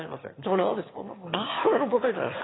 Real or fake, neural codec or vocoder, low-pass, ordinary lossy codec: fake; autoencoder, 22.05 kHz, a latent of 192 numbers a frame, VITS, trained on one speaker; 7.2 kHz; AAC, 16 kbps